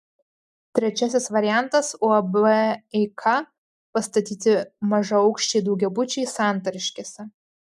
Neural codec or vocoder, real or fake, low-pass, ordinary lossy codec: none; real; 14.4 kHz; MP3, 96 kbps